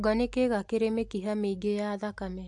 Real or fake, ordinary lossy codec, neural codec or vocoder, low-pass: real; AAC, 64 kbps; none; 10.8 kHz